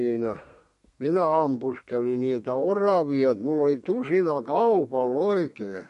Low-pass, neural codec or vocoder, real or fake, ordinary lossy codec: 14.4 kHz; codec, 32 kHz, 1.9 kbps, SNAC; fake; MP3, 48 kbps